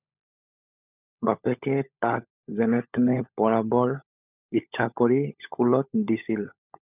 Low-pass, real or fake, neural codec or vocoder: 3.6 kHz; fake; codec, 16 kHz, 16 kbps, FunCodec, trained on LibriTTS, 50 frames a second